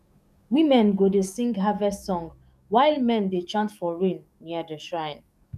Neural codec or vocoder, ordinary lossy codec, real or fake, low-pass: codec, 44.1 kHz, 7.8 kbps, DAC; none; fake; 14.4 kHz